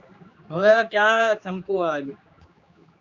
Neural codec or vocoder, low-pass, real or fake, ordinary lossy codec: codec, 16 kHz, 2 kbps, X-Codec, HuBERT features, trained on general audio; 7.2 kHz; fake; Opus, 64 kbps